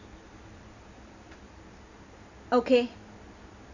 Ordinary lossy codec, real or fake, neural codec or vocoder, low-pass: none; real; none; 7.2 kHz